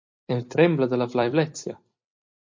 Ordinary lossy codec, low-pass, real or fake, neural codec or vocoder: MP3, 48 kbps; 7.2 kHz; fake; vocoder, 44.1 kHz, 128 mel bands every 256 samples, BigVGAN v2